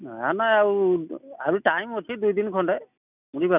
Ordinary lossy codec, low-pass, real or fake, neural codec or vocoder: none; 3.6 kHz; real; none